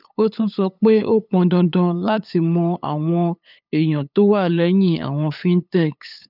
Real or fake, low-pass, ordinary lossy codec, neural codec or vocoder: fake; 5.4 kHz; none; codec, 16 kHz, 8 kbps, FunCodec, trained on LibriTTS, 25 frames a second